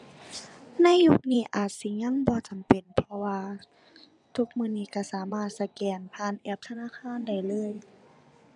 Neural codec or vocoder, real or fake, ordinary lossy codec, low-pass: vocoder, 24 kHz, 100 mel bands, Vocos; fake; none; 10.8 kHz